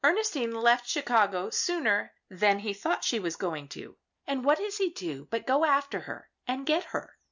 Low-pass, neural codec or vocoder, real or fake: 7.2 kHz; none; real